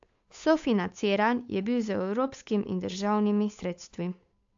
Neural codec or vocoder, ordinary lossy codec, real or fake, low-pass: codec, 16 kHz, 8 kbps, FunCodec, trained on Chinese and English, 25 frames a second; AAC, 64 kbps; fake; 7.2 kHz